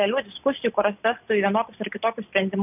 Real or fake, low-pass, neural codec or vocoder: real; 3.6 kHz; none